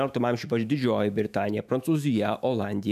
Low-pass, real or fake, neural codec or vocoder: 14.4 kHz; fake; autoencoder, 48 kHz, 128 numbers a frame, DAC-VAE, trained on Japanese speech